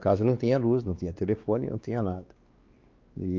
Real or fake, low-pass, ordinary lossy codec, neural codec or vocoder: fake; 7.2 kHz; Opus, 32 kbps; codec, 16 kHz, 2 kbps, X-Codec, WavLM features, trained on Multilingual LibriSpeech